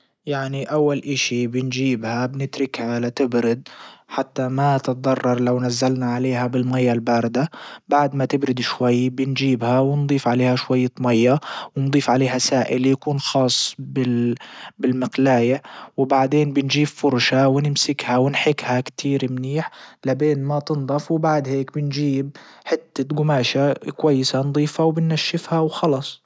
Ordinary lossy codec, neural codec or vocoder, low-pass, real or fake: none; none; none; real